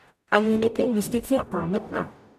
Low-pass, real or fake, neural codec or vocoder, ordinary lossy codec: 14.4 kHz; fake; codec, 44.1 kHz, 0.9 kbps, DAC; none